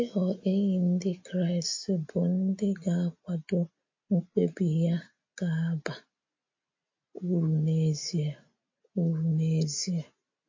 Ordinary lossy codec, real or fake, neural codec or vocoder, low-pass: MP3, 32 kbps; real; none; 7.2 kHz